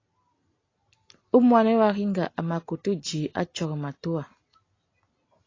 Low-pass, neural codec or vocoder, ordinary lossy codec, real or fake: 7.2 kHz; none; AAC, 32 kbps; real